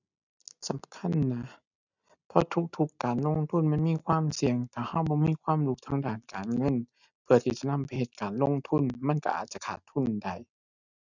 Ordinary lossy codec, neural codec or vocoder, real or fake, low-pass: none; none; real; 7.2 kHz